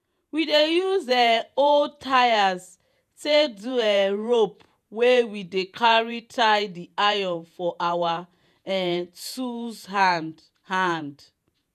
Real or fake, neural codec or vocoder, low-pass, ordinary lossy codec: fake; vocoder, 48 kHz, 128 mel bands, Vocos; 14.4 kHz; none